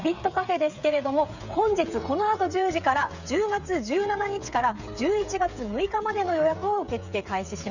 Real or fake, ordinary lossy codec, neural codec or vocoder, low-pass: fake; none; codec, 16 kHz, 8 kbps, FreqCodec, smaller model; 7.2 kHz